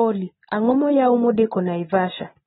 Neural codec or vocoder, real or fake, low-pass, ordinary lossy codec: none; real; 9.9 kHz; AAC, 16 kbps